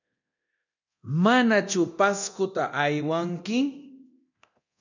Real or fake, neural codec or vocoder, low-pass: fake; codec, 24 kHz, 0.9 kbps, DualCodec; 7.2 kHz